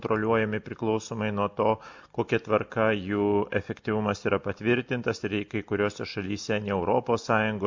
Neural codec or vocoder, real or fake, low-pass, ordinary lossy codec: none; real; 7.2 kHz; MP3, 48 kbps